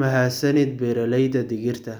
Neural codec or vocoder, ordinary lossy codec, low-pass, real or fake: none; none; none; real